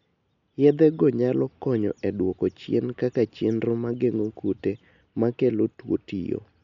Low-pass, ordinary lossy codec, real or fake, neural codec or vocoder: 7.2 kHz; MP3, 96 kbps; real; none